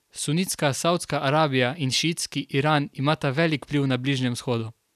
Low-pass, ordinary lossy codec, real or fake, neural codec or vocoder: 14.4 kHz; none; real; none